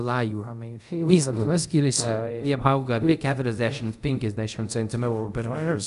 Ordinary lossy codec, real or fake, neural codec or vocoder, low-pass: AAC, 96 kbps; fake; codec, 24 kHz, 0.5 kbps, DualCodec; 10.8 kHz